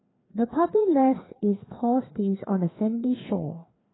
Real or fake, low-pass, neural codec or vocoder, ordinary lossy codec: fake; 7.2 kHz; codec, 16 kHz, 2 kbps, FreqCodec, larger model; AAC, 16 kbps